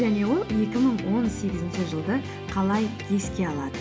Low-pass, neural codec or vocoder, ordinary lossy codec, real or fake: none; none; none; real